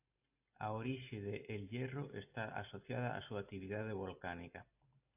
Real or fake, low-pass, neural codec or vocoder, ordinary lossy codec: real; 3.6 kHz; none; AAC, 32 kbps